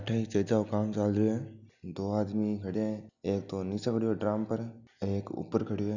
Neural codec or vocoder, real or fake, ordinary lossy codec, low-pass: none; real; none; 7.2 kHz